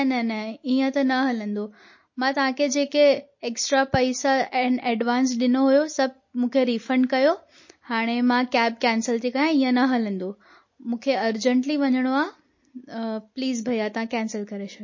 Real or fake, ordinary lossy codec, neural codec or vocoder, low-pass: real; MP3, 32 kbps; none; 7.2 kHz